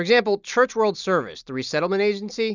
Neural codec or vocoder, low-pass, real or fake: none; 7.2 kHz; real